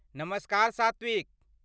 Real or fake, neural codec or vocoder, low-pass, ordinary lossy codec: real; none; none; none